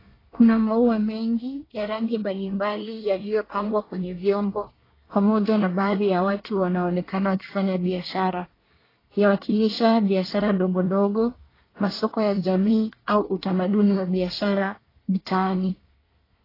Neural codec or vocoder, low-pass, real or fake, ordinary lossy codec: codec, 24 kHz, 1 kbps, SNAC; 5.4 kHz; fake; AAC, 24 kbps